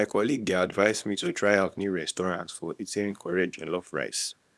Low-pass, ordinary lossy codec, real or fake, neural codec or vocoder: none; none; fake; codec, 24 kHz, 0.9 kbps, WavTokenizer, small release